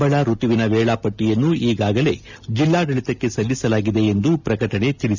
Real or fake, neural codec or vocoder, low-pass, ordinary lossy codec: real; none; 7.2 kHz; none